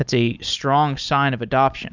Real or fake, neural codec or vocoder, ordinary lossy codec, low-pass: real; none; Opus, 64 kbps; 7.2 kHz